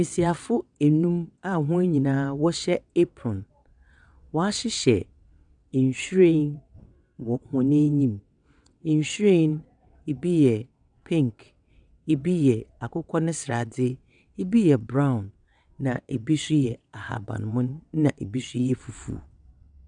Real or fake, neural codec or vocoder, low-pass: fake; vocoder, 22.05 kHz, 80 mel bands, WaveNeXt; 9.9 kHz